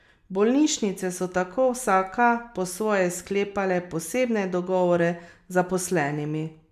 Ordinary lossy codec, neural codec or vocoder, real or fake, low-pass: none; none; real; 14.4 kHz